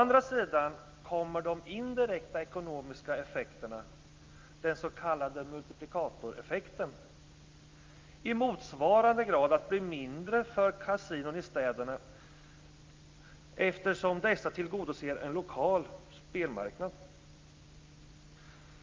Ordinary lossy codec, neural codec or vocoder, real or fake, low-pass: Opus, 24 kbps; none; real; 7.2 kHz